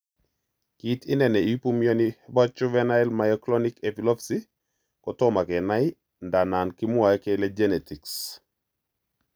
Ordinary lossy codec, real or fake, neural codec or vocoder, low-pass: none; real; none; none